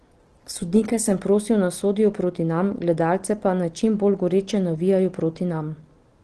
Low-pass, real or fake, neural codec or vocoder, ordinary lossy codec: 9.9 kHz; real; none; Opus, 16 kbps